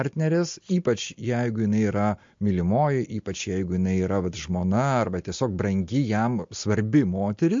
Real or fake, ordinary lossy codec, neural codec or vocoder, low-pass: real; MP3, 48 kbps; none; 7.2 kHz